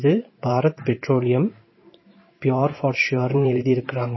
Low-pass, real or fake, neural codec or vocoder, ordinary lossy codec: 7.2 kHz; fake; vocoder, 44.1 kHz, 128 mel bands every 512 samples, BigVGAN v2; MP3, 24 kbps